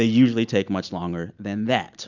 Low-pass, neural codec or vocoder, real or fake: 7.2 kHz; none; real